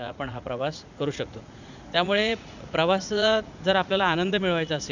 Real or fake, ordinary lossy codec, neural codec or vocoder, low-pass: fake; none; vocoder, 44.1 kHz, 80 mel bands, Vocos; 7.2 kHz